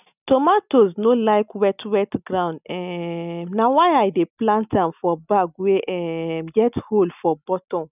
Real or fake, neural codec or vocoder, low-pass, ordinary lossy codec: real; none; 3.6 kHz; none